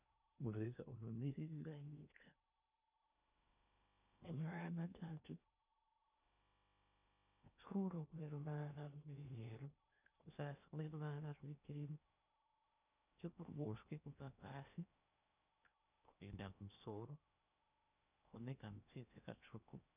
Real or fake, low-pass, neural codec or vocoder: fake; 3.6 kHz; codec, 16 kHz in and 24 kHz out, 0.8 kbps, FocalCodec, streaming, 65536 codes